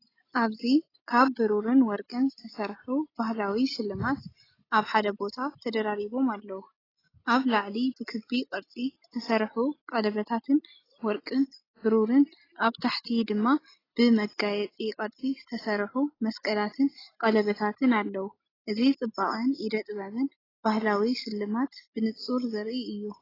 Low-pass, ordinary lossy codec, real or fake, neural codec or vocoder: 5.4 kHz; AAC, 24 kbps; real; none